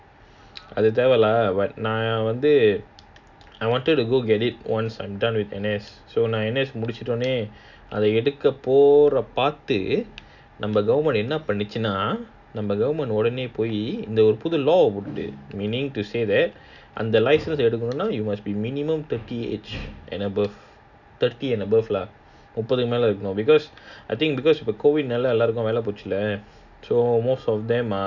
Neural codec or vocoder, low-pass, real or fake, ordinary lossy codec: none; 7.2 kHz; real; none